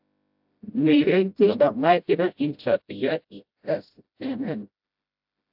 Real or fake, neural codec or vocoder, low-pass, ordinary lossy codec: fake; codec, 16 kHz, 0.5 kbps, FreqCodec, smaller model; 5.4 kHz; AAC, 48 kbps